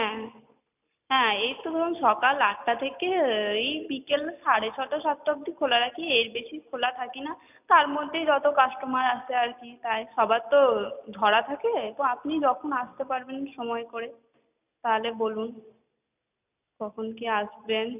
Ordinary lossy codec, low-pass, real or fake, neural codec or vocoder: none; 3.6 kHz; real; none